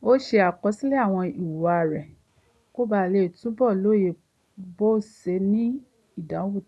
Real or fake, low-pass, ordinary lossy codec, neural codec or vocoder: real; none; none; none